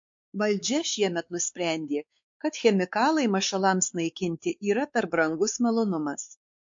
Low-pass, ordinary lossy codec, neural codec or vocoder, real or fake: 7.2 kHz; MP3, 48 kbps; codec, 16 kHz, 4 kbps, X-Codec, WavLM features, trained on Multilingual LibriSpeech; fake